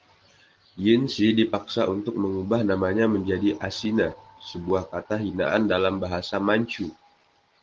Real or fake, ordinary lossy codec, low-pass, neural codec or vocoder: real; Opus, 16 kbps; 7.2 kHz; none